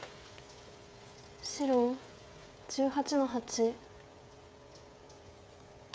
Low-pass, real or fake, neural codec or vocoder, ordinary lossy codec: none; fake; codec, 16 kHz, 16 kbps, FreqCodec, smaller model; none